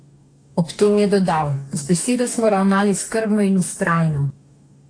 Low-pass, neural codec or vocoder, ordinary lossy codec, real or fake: 9.9 kHz; codec, 44.1 kHz, 2.6 kbps, DAC; AAC, 48 kbps; fake